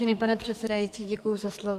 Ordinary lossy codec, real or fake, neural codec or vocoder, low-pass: AAC, 96 kbps; fake; codec, 44.1 kHz, 2.6 kbps, SNAC; 14.4 kHz